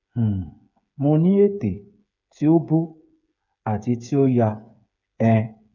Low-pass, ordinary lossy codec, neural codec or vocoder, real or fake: 7.2 kHz; none; codec, 16 kHz, 8 kbps, FreqCodec, smaller model; fake